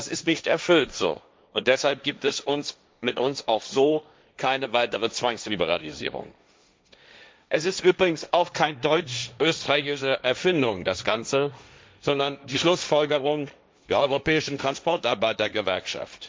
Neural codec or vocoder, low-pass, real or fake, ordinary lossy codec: codec, 16 kHz, 1.1 kbps, Voila-Tokenizer; none; fake; none